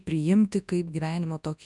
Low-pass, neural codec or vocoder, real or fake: 10.8 kHz; codec, 24 kHz, 0.9 kbps, WavTokenizer, large speech release; fake